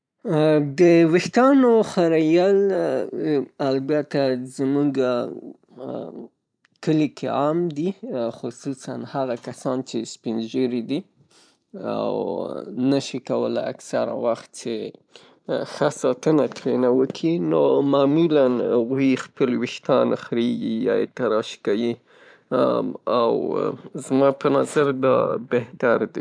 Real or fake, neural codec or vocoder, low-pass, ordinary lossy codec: fake; vocoder, 44.1 kHz, 128 mel bands every 512 samples, BigVGAN v2; 9.9 kHz; MP3, 96 kbps